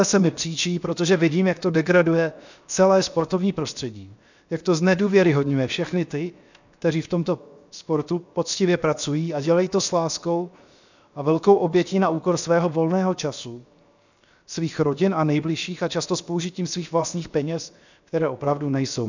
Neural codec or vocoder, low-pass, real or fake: codec, 16 kHz, 0.7 kbps, FocalCodec; 7.2 kHz; fake